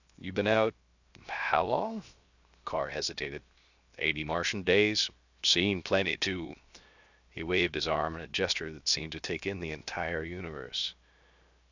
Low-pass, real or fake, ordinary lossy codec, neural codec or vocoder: 7.2 kHz; fake; Opus, 64 kbps; codec, 16 kHz, 0.7 kbps, FocalCodec